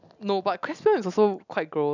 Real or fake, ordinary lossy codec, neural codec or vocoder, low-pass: real; none; none; 7.2 kHz